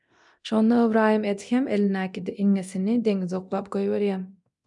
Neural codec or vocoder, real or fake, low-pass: codec, 24 kHz, 0.9 kbps, DualCodec; fake; 10.8 kHz